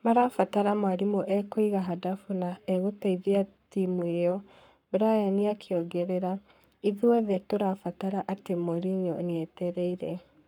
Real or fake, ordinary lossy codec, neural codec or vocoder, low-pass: fake; none; codec, 44.1 kHz, 7.8 kbps, Pupu-Codec; 19.8 kHz